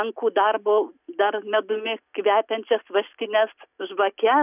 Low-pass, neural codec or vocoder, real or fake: 3.6 kHz; none; real